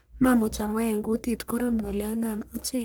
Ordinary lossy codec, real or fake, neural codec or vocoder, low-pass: none; fake; codec, 44.1 kHz, 1.7 kbps, Pupu-Codec; none